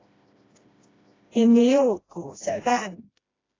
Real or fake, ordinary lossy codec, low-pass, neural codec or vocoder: fake; AAC, 32 kbps; 7.2 kHz; codec, 16 kHz, 1 kbps, FreqCodec, smaller model